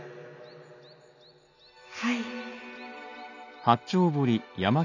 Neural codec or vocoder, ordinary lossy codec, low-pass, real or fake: none; none; 7.2 kHz; real